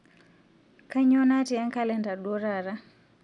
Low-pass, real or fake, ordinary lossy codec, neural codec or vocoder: 10.8 kHz; real; none; none